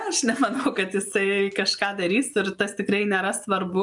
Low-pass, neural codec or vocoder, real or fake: 10.8 kHz; none; real